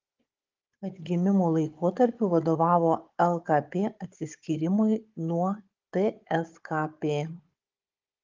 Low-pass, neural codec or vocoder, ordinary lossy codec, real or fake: 7.2 kHz; codec, 16 kHz, 16 kbps, FunCodec, trained on Chinese and English, 50 frames a second; Opus, 32 kbps; fake